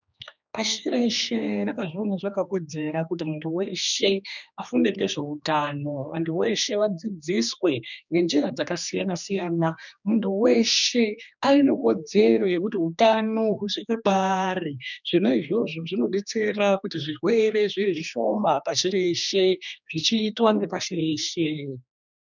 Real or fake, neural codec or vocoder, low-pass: fake; codec, 16 kHz, 2 kbps, X-Codec, HuBERT features, trained on general audio; 7.2 kHz